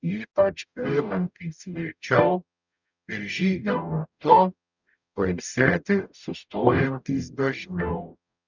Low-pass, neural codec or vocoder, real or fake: 7.2 kHz; codec, 44.1 kHz, 0.9 kbps, DAC; fake